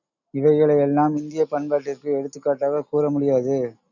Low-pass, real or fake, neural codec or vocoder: 7.2 kHz; real; none